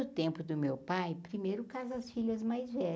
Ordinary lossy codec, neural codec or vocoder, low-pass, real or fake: none; none; none; real